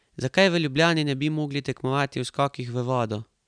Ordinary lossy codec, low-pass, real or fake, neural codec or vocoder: none; 9.9 kHz; real; none